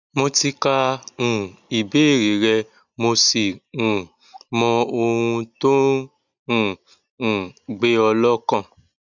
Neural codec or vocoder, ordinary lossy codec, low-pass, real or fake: none; none; 7.2 kHz; real